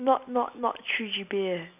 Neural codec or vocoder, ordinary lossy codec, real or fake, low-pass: none; none; real; 3.6 kHz